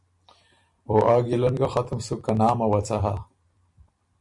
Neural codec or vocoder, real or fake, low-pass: vocoder, 44.1 kHz, 128 mel bands every 256 samples, BigVGAN v2; fake; 10.8 kHz